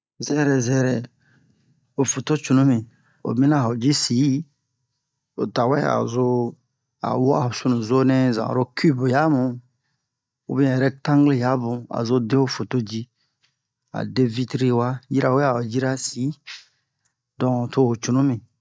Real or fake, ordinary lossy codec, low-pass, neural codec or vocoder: real; none; none; none